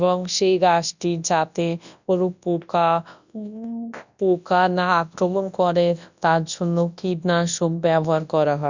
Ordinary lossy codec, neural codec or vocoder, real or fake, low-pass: none; codec, 24 kHz, 0.9 kbps, WavTokenizer, large speech release; fake; 7.2 kHz